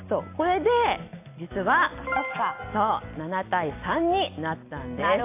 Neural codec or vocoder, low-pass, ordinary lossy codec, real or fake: none; 3.6 kHz; AAC, 24 kbps; real